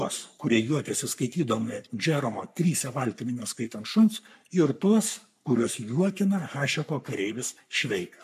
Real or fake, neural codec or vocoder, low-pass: fake; codec, 44.1 kHz, 3.4 kbps, Pupu-Codec; 14.4 kHz